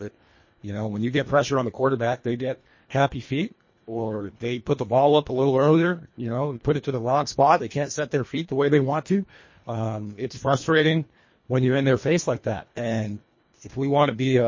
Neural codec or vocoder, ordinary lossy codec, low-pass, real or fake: codec, 24 kHz, 1.5 kbps, HILCodec; MP3, 32 kbps; 7.2 kHz; fake